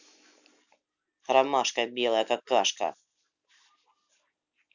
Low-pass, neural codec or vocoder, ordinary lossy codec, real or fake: 7.2 kHz; none; none; real